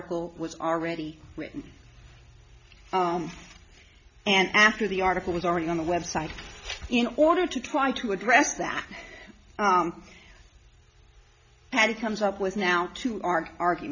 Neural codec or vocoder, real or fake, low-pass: none; real; 7.2 kHz